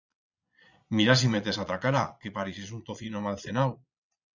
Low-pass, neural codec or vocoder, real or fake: 7.2 kHz; vocoder, 22.05 kHz, 80 mel bands, Vocos; fake